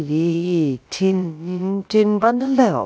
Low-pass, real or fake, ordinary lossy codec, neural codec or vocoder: none; fake; none; codec, 16 kHz, about 1 kbps, DyCAST, with the encoder's durations